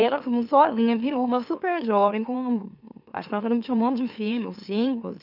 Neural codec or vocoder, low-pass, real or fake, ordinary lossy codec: autoencoder, 44.1 kHz, a latent of 192 numbers a frame, MeloTTS; 5.4 kHz; fake; none